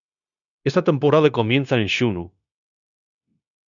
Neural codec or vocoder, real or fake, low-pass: codec, 16 kHz, 0.9 kbps, LongCat-Audio-Codec; fake; 7.2 kHz